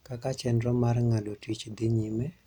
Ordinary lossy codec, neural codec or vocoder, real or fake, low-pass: none; none; real; 19.8 kHz